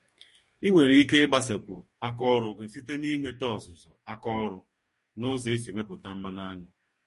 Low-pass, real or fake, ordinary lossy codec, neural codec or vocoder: 14.4 kHz; fake; MP3, 48 kbps; codec, 44.1 kHz, 2.6 kbps, DAC